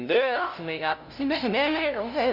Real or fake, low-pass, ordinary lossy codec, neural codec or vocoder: fake; 5.4 kHz; none; codec, 16 kHz, 0.5 kbps, FunCodec, trained on LibriTTS, 25 frames a second